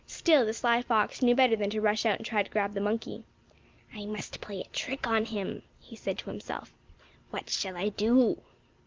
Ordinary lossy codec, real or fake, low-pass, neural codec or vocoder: Opus, 32 kbps; real; 7.2 kHz; none